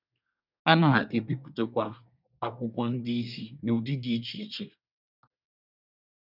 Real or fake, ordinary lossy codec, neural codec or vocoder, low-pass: fake; none; codec, 24 kHz, 1 kbps, SNAC; 5.4 kHz